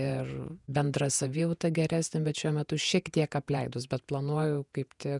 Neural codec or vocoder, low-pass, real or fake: vocoder, 48 kHz, 128 mel bands, Vocos; 10.8 kHz; fake